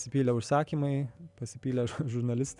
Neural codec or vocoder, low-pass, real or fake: none; 10.8 kHz; real